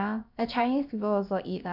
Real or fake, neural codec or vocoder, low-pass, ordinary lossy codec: fake; codec, 16 kHz, about 1 kbps, DyCAST, with the encoder's durations; 5.4 kHz; none